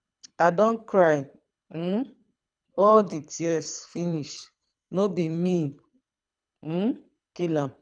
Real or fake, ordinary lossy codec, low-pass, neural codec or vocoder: fake; none; 9.9 kHz; codec, 24 kHz, 3 kbps, HILCodec